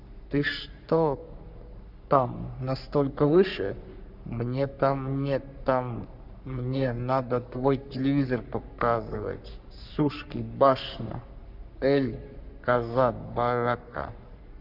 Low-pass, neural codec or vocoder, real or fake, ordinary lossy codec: 5.4 kHz; codec, 44.1 kHz, 3.4 kbps, Pupu-Codec; fake; none